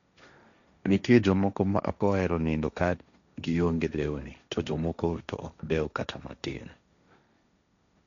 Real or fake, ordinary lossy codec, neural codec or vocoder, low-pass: fake; none; codec, 16 kHz, 1.1 kbps, Voila-Tokenizer; 7.2 kHz